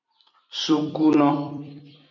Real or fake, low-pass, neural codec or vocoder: fake; 7.2 kHz; vocoder, 44.1 kHz, 128 mel bands every 256 samples, BigVGAN v2